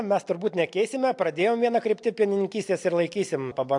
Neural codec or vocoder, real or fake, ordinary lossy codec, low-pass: none; real; AAC, 64 kbps; 10.8 kHz